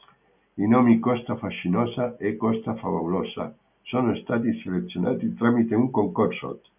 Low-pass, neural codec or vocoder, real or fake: 3.6 kHz; none; real